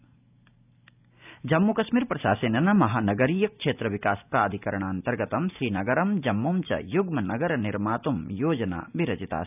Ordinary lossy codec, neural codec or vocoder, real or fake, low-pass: none; none; real; 3.6 kHz